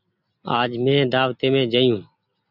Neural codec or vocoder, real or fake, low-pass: none; real; 5.4 kHz